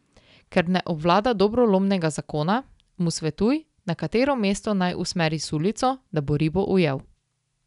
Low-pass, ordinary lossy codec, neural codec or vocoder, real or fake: 10.8 kHz; none; none; real